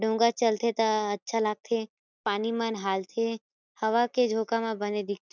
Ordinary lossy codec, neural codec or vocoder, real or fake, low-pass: none; none; real; 7.2 kHz